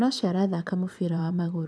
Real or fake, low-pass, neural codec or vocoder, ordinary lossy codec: fake; none; vocoder, 22.05 kHz, 80 mel bands, Vocos; none